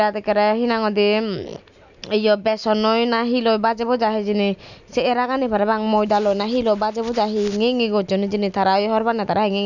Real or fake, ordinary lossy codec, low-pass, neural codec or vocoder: real; none; 7.2 kHz; none